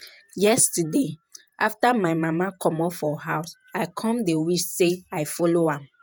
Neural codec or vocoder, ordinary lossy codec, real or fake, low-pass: vocoder, 48 kHz, 128 mel bands, Vocos; none; fake; none